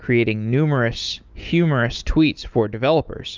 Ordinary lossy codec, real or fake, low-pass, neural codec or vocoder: Opus, 24 kbps; fake; 7.2 kHz; autoencoder, 48 kHz, 128 numbers a frame, DAC-VAE, trained on Japanese speech